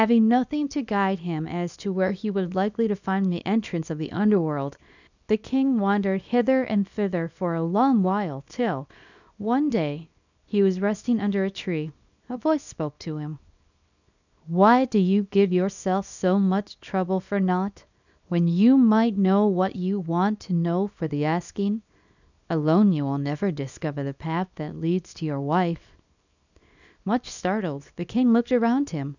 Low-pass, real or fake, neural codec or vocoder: 7.2 kHz; fake; codec, 24 kHz, 0.9 kbps, WavTokenizer, small release